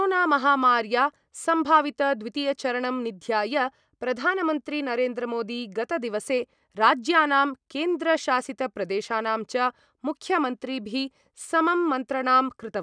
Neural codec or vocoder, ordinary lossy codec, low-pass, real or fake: none; none; 9.9 kHz; real